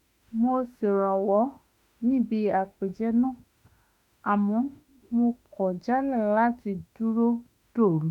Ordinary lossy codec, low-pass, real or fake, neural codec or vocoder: none; 19.8 kHz; fake; autoencoder, 48 kHz, 32 numbers a frame, DAC-VAE, trained on Japanese speech